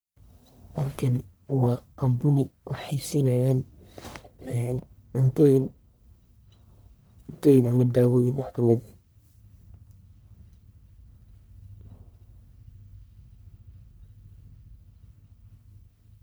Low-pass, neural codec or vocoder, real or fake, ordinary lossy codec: none; codec, 44.1 kHz, 1.7 kbps, Pupu-Codec; fake; none